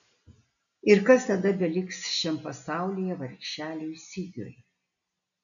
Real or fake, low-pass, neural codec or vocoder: real; 7.2 kHz; none